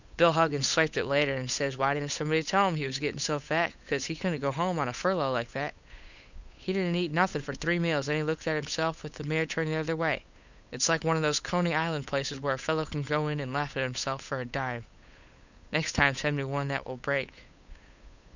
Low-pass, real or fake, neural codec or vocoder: 7.2 kHz; fake; codec, 16 kHz, 8 kbps, FunCodec, trained on Chinese and English, 25 frames a second